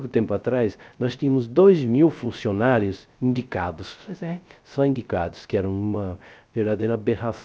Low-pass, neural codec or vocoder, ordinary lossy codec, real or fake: none; codec, 16 kHz, 0.3 kbps, FocalCodec; none; fake